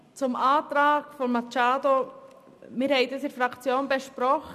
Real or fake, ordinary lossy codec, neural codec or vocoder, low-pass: real; MP3, 96 kbps; none; 14.4 kHz